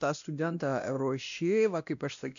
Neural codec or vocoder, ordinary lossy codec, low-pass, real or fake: codec, 16 kHz, 1 kbps, X-Codec, WavLM features, trained on Multilingual LibriSpeech; AAC, 96 kbps; 7.2 kHz; fake